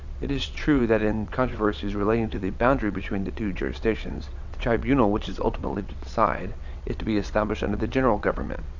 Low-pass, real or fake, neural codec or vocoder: 7.2 kHz; fake; vocoder, 22.05 kHz, 80 mel bands, WaveNeXt